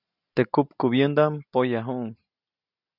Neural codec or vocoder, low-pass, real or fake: none; 5.4 kHz; real